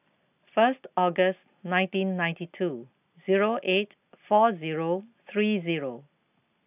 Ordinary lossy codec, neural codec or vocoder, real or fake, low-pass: AAC, 32 kbps; none; real; 3.6 kHz